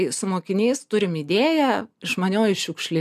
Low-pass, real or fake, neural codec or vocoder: 14.4 kHz; real; none